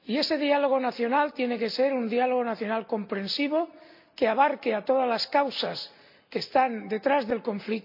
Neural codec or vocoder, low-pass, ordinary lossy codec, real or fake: none; 5.4 kHz; MP3, 48 kbps; real